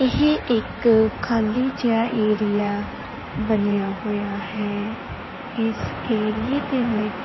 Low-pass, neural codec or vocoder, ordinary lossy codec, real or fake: 7.2 kHz; codec, 16 kHz in and 24 kHz out, 2.2 kbps, FireRedTTS-2 codec; MP3, 24 kbps; fake